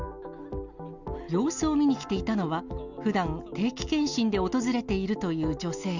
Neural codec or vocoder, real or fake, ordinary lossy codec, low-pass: none; real; none; 7.2 kHz